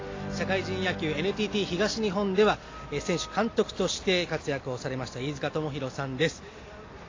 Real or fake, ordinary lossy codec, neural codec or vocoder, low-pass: real; AAC, 32 kbps; none; 7.2 kHz